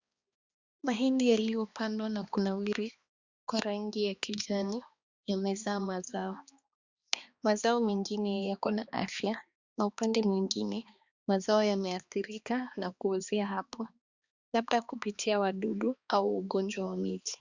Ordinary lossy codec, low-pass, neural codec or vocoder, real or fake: Opus, 64 kbps; 7.2 kHz; codec, 16 kHz, 2 kbps, X-Codec, HuBERT features, trained on balanced general audio; fake